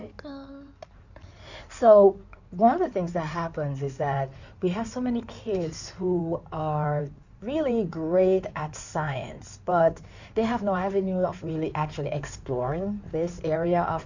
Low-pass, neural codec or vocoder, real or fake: 7.2 kHz; codec, 16 kHz in and 24 kHz out, 2.2 kbps, FireRedTTS-2 codec; fake